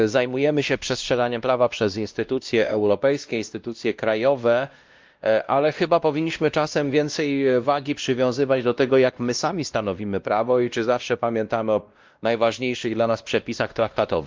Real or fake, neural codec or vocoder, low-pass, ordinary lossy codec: fake; codec, 16 kHz, 1 kbps, X-Codec, WavLM features, trained on Multilingual LibriSpeech; 7.2 kHz; Opus, 24 kbps